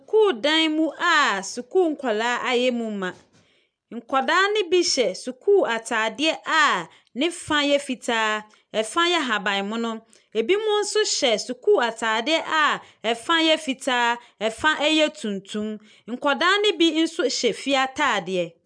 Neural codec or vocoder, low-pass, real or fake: none; 9.9 kHz; real